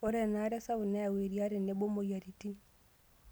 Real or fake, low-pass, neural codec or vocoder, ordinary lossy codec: real; none; none; none